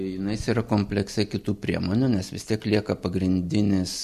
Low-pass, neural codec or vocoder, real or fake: 14.4 kHz; none; real